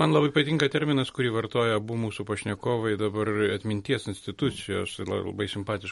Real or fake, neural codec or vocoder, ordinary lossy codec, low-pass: real; none; MP3, 48 kbps; 19.8 kHz